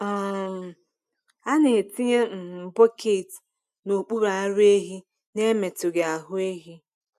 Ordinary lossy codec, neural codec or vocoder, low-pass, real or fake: none; none; 14.4 kHz; real